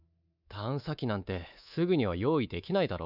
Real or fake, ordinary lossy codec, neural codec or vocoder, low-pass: fake; none; codec, 24 kHz, 3.1 kbps, DualCodec; 5.4 kHz